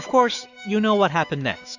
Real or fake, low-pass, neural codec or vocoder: fake; 7.2 kHz; autoencoder, 48 kHz, 128 numbers a frame, DAC-VAE, trained on Japanese speech